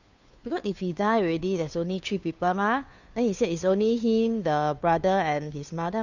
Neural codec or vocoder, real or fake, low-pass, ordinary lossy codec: codec, 16 kHz, 2 kbps, FunCodec, trained on Chinese and English, 25 frames a second; fake; 7.2 kHz; none